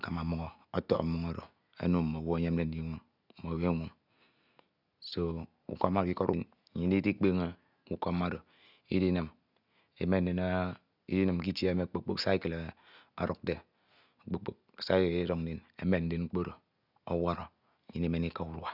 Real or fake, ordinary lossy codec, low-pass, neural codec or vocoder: real; none; 5.4 kHz; none